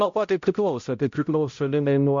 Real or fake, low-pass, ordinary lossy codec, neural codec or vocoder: fake; 7.2 kHz; MP3, 48 kbps; codec, 16 kHz, 0.5 kbps, X-Codec, HuBERT features, trained on balanced general audio